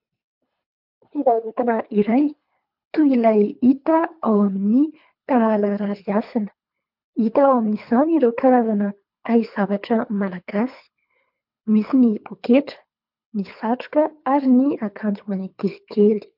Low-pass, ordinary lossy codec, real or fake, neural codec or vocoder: 5.4 kHz; MP3, 48 kbps; fake; codec, 24 kHz, 3 kbps, HILCodec